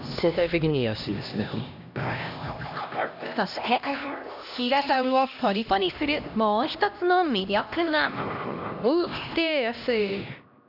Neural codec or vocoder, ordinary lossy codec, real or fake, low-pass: codec, 16 kHz, 1 kbps, X-Codec, HuBERT features, trained on LibriSpeech; none; fake; 5.4 kHz